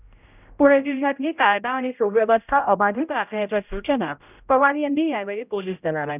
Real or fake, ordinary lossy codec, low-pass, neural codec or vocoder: fake; none; 3.6 kHz; codec, 16 kHz, 0.5 kbps, X-Codec, HuBERT features, trained on general audio